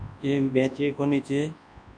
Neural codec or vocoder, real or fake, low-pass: codec, 24 kHz, 0.9 kbps, WavTokenizer, large speech release; fake; 9.9 kHz